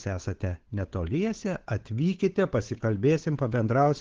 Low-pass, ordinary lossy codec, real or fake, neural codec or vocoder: 7.2 kHz; Opus, 16 kbps; fake; codec, 16 kHz, 8 kbps, FunCodec, trained on Chinese and English, 25 frames a second